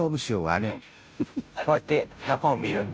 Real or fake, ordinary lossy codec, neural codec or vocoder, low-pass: fake; none; codec, 16 kHz, 0.5 kbps, FunCodec, trained on Chinese and English, 25 frames a second; none